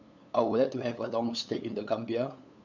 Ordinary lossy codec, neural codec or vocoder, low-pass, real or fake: none; codec, 16 kHz, 8 kbps, FunCodec, trained on LibriTTS, 25 frames a second; 7.2 kHz; fake